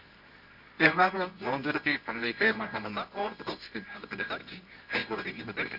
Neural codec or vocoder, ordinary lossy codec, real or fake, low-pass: codec, 24 kHz, 0.9 kbps, WavTokenizer, medium music audio release; none; fake; 5.4 kHz